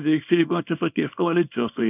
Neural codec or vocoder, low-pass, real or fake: codec, 24 kHz, 0.9 kbps, WavTokenizer, small release; 3.6 kHz; fake